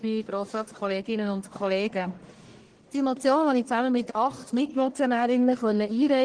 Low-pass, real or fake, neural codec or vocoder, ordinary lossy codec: 9.9 kHz; fake; codec, 44.1 kHz, 1.7 kbps, Pupu-Codec; Opus, 16 kbps